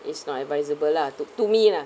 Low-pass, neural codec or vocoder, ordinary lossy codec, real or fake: none; none; none; real